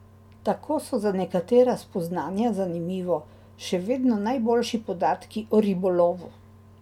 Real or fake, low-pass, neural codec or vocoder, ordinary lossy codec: real; 19.8 kHz; none; MP3, 96 kbps